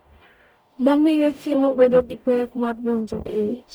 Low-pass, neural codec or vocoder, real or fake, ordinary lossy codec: none; codec, 44.1 kHz, 0.9 kbps, DAC; fake; none